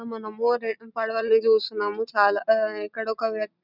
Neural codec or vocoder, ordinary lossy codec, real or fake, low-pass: none; none; real; 5.4 kHz